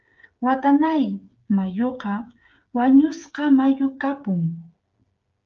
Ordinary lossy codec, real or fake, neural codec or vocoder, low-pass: Opus, 24 kbps; fake; codec, 16 kHz, 8 kbps, FreqCodec, smaller model; 7.2 kHz